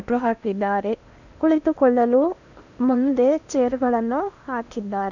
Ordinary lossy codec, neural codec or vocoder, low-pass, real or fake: none; codec, 16 kHz in and 24 kHz out, 0.8 kbps, FocalCodec, streaming, 65536 codes; 7.2 kHz; fake